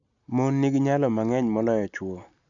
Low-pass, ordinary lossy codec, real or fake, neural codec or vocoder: 7.2 kHz; none; real; none